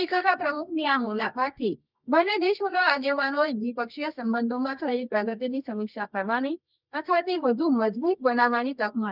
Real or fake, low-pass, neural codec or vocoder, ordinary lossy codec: fake; 5.4 kHz; codec, 24 kHz, 0.9 kbps, WavTokenizer, medium music audio release; none